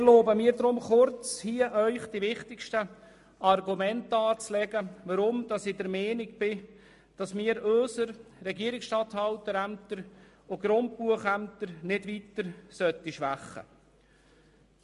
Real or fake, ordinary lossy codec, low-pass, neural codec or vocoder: real; MP3, 48 kbps; 14.4 kHz; none